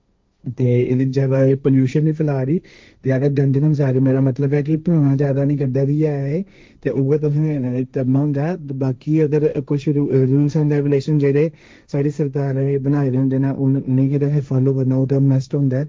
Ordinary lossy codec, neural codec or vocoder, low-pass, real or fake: none; codec, 16 kHz, 1.1 kbps, Voila-Tokenizer; none; fake